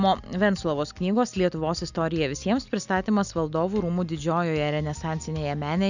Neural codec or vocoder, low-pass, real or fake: none; 7.2 kHz; real